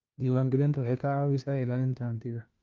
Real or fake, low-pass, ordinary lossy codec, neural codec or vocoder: fake; 7.2 kHz; Opus, 32 kbps; codec, 16 kHz, 1 kbps, FunCodec, trained on LibriTTS, 50 frames a second